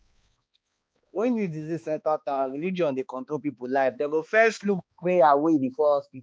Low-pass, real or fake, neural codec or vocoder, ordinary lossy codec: none; fake; codec, 16 kHz, 2 kbps, X-Codec, HuBERT features, trained on balanced general audio; none